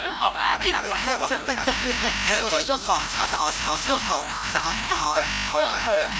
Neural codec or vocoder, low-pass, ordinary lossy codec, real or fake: codec, 16 kHz, 0.5 kbps, FreqCodec, larger model; none; none; fake